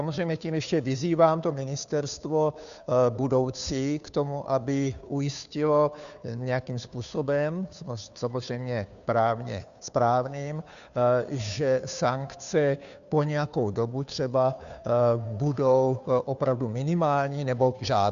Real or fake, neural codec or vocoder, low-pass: fake; codec, 16 kHz, 2 kbps, FunCodec, trained on Chinese and English, 25 frames a second; 7.2 kHz